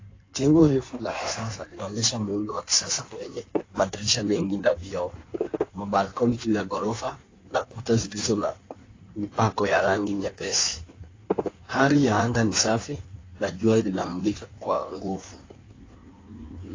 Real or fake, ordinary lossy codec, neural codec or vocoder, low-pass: fake; AAC, 32 kbps; codec, 16 kHz in and 24 kHz out, 1.1 kbps, FireRedTTS-2 codec; 7.2 kHz